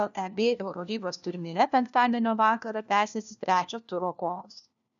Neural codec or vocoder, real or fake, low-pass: codec, 16 kHz, 1 kbps, FunCodec, trained on LibriTTS, 50 frames a second; fake; 7.2 kHz